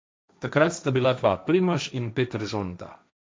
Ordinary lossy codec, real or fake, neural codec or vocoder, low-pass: none; fake; codec, 16 kHz, 1.1 kbps, Voila-Tokenizer; none